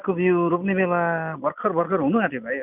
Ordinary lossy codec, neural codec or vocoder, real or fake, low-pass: none; none; real; 3.6 kHz